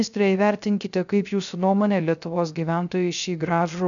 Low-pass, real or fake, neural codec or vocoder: 7.2 kHz; fake; codec, 16 kHz, 0.3 kbps, FocalCodec